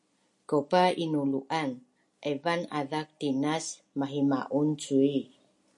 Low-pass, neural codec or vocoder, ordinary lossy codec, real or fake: 10.8 kHz; none; MP3, 48 kbps; real